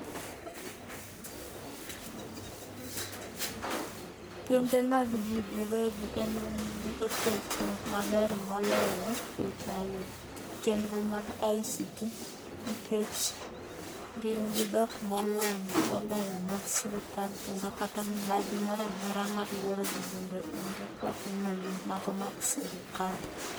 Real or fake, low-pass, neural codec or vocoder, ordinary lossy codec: fake; none; codec, 44.1 kHz, 1.7 kbps, Pupu-Codec; none